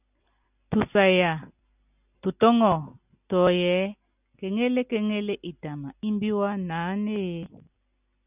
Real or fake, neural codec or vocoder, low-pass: real; none; 3.6 kHz